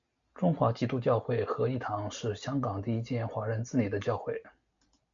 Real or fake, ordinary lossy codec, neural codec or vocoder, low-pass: real; MP3, 64 kbps; none; 7.2 kHz